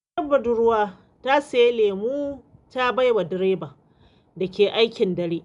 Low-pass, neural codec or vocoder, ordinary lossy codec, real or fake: 10.8 kHz; none; none; real